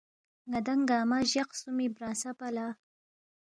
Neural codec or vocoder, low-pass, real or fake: none; 9.9 kHz; real